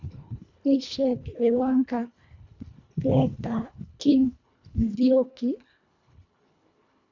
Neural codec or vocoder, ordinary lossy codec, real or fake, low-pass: codec, 24 kHz, 1.5 kbps, HILCodec; none; fake; 7.2 kHz